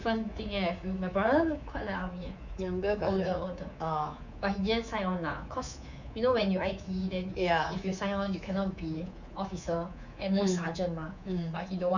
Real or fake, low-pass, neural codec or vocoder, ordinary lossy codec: fake; 7.2 kHz; codec, 24 kHz, 3.1 kbps, DualCodec; none